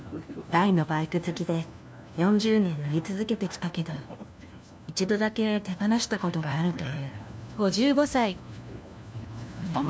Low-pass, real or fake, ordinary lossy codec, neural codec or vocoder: none; fake; none; codec, 16 kHz, 1 kbps, FunCodec, trained on LibriTTS, 50 frames a second